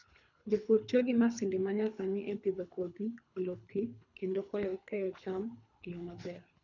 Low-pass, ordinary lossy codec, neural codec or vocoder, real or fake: 7.2 kHz; none; codec, 24 kHz, 3 kbps, HILCodec; fake